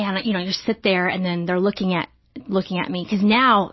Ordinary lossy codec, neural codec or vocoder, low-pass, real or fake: MP3, 24 kbps; none; 7.2 kHz; real